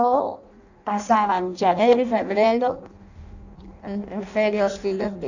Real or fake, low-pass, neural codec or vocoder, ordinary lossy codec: fake; 7.2 kHz; codec, 16 kHz in and 24 kHz out, 0.6 kbps, FireRedTTS-2 codec; none